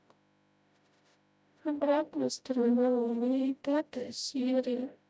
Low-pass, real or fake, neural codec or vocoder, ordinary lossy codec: none; fake; codec, 16 kHz, 0.5 kbps, FreqCodec, smaller model; none